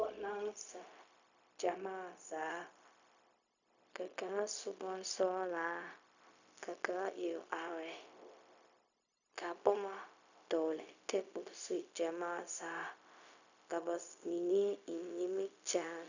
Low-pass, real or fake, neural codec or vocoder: 7.2 kHz; fake; codec, 16 kHz, 0.4 kbps, LongCat-Audio-Codec